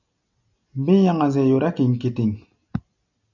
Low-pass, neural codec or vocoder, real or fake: 7.2 kHz; none; real